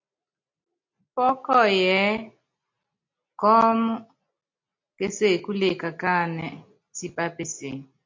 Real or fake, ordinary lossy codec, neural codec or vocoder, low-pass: real; MP3, 48 kbps; none; 7.2 kHz